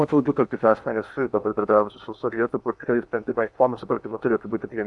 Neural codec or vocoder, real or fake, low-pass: codec, 16 kHz in and 24 kHz out, 0.8 kbps, FocalCodec, streaming, 65536 codes; fake; 10.8 kHz